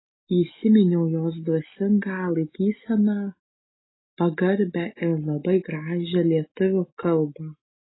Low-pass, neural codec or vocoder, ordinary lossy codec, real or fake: 7.2 kHz; none; AAC, 16 kbps; real